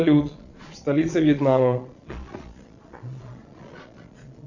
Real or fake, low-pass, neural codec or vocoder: fake; 7.2 kHz; vocoder, 22.05 kHz, 80 mel bands, Vocos